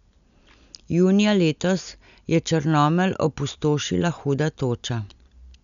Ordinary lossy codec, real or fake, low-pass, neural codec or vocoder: none; real; 7.2 kHz; none